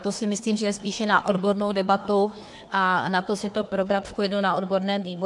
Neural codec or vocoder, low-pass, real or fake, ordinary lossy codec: codec, 24 kHz, 1 kbps, SNAC; 10.8 kHz; fake; AAC, 64 kbps